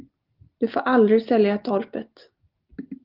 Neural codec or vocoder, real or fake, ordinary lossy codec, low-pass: none; real; Opus, 32 kbps; 5.4 kHz